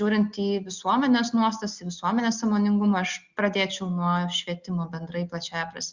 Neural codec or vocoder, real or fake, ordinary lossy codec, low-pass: none; real; Opus, 64 kbps; 7.2 kHz